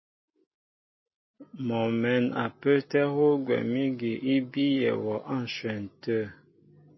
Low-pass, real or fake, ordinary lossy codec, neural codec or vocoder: 7.2 kHz; real; MP3, 24 kbps; none